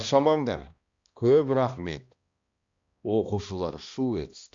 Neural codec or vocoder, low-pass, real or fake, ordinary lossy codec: codec, 16 kHz, 1 kbps, X-Codec, HuBERT features, trained on balanced general audio; 7.2 kHz; fake; Opus, 64 kbps